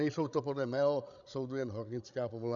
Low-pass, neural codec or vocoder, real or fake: 7.2 kHz; codec, 16 kHz, 16 kbps, FreqCodec, larger model; fake